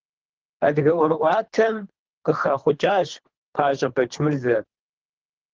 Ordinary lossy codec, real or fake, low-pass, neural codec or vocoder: Opus, 16 kbps; fake; 7.2 kHz; codec, 24 kHz, 3 kbps, HILCodec